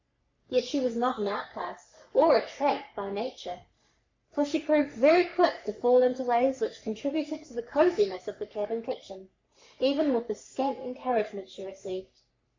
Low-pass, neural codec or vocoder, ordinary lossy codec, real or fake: 7.2 kHz; codec, 44.1 kHz, 3.4 kbps, Pupu-Codec; MP3, 64 kbps; fake